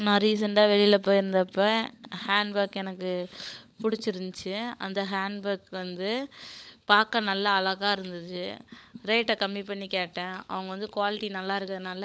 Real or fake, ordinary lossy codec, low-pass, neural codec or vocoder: fake; none; none; codec, 16 kHz, 16 kbps, FunCodec, trained on LibriTTS, 50 frames a second